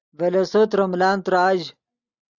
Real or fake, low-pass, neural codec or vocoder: real; 7.2 kHz; none